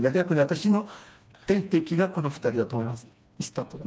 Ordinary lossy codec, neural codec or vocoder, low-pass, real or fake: none; codec, 16 kHz, 2 kbps, FreqCodec, smaller model; none; fake